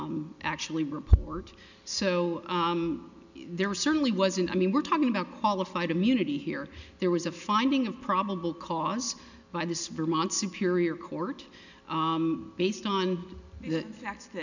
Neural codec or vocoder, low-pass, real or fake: none; 7.2 kHz; real